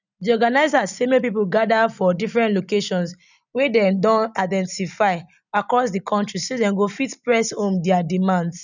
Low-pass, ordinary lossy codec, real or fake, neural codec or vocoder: 7.2 kHz; none; real; none